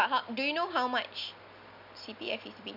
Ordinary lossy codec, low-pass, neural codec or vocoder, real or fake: none; 5.4 kHz; none; real